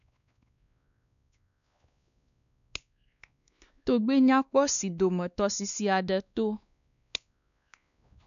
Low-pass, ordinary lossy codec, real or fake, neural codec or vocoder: 7.2 kHz; none; fake; codec, 16 kHz, 2 kbps, X-Codec, WavLM features, trained on Multilingual LibriSpeech